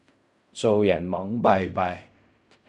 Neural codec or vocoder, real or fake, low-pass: codec, 16 kHz in and 24 kHz out, 0.4 kbps, LongCat-Audio-Codec, fine tuned four codebook decoder; fake; 10.8 kHz